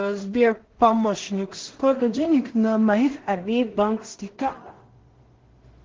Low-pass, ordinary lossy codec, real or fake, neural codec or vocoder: 7.2 kHz; Opus, 16 kbps; fake; codec, 16 kHz in and 24 kHz out, 0.4 kbps, LongCat-Audio-Codec, two codebook decoder